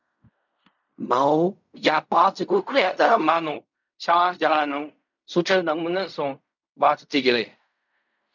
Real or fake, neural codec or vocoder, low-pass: fake; codec, 16 kHz in and 24 kHz out, 0.4 kbps, LongCat-Audio-Codec, fine tuned four codebook decoder; 7.2 kHz